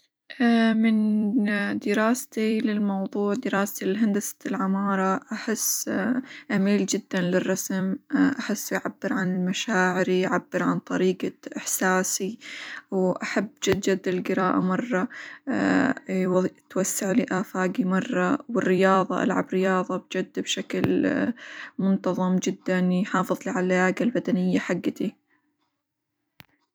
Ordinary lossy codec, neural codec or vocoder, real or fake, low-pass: none; vocoder, 44.1 kHz, 128 mel bands every 256 samples, BigVGAN v2; fake; none